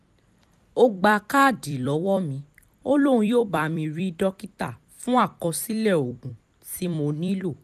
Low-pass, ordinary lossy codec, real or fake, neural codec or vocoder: 14.4 kHz; none; fake; vocoder, 44.1 kHz, 128 mel bands every 256 samples, BigVGAN v2